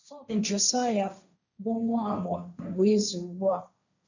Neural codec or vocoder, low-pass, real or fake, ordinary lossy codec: codec, 16 kHz, 1.1 kbps, Voila-Tokenizer; 7.2 kHz; fake; none